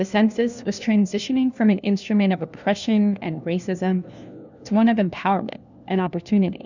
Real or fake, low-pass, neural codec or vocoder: fake; 7.2 kHz; codec, 16 kHz, 1 kbps, FunCodec, trained on LibriTTS, 50 frames a second